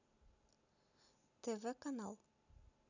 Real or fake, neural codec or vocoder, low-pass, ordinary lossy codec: real; none; 7.2 kHz; none